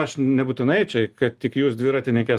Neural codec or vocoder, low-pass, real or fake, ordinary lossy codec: none; 14.4 kHz; real; Opus, 24 kbps